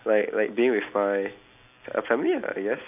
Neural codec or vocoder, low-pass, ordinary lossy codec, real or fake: none; 3.6 kHz; none; real